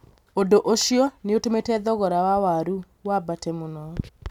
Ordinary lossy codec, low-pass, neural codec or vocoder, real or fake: none; 19.8 kHz; none; real